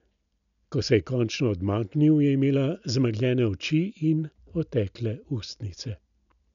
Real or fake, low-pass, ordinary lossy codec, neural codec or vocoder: real; 7.2 kHz; none; none